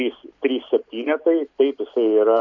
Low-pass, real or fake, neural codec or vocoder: 7.2 kHz; real; none